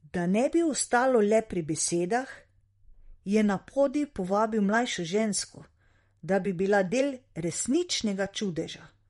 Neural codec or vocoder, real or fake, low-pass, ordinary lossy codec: vocoder, 44.1 kHz, 128 mel bands, Pupu-Vocoder; fake; 19.8 kHz; MP3, 48 kbps